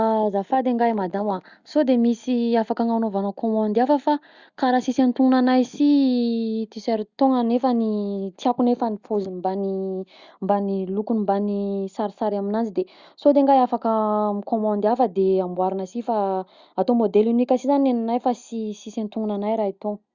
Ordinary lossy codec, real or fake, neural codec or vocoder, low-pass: Opus, 64 kbps; real; none; 7.2 kHz